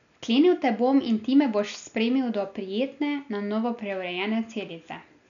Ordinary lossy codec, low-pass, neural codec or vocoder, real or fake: none; 7.2 kHz; none; real